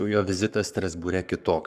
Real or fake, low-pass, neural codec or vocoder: fake; 14.4 kHz; codec, 44.1 kHz, 7.8 kbps, Pupu-Codec